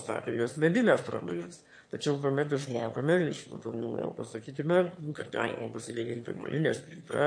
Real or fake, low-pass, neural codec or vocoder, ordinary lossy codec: fake; 9.9 kHz; autoencoder, 22.05 kHz, a latent of 192 numbers a frame, VITS, trained on one speaker; MP3, 48 kbps